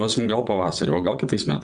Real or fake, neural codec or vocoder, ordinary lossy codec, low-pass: fake; vocoder, 22.05 kHz, 80 mel bands, WaveNeXt; Opus, 64 kbps; 9.9 kHz